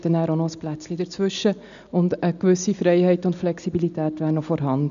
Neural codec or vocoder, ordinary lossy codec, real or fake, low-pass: none; none; real; 7.2 kHz